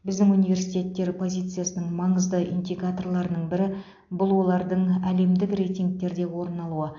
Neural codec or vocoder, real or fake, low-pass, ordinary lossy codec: none; real; 7.2 kHz; AAC, 48 kbps